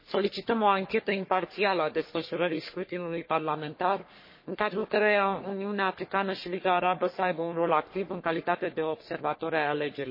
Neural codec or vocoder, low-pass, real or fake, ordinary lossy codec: codec, 44.1 kHz, 3.4 kbps, Pupu-Codec; 5.4 kHz; fake; MP3, 24 kbps